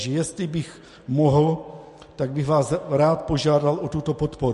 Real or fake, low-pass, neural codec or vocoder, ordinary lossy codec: real; 14.4 kHz; none; MP3, 48 kbps